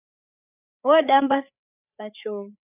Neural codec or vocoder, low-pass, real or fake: codec, 16 kHz, 8 kbps, FreqCodec, larger model; 3.6 kHz; fake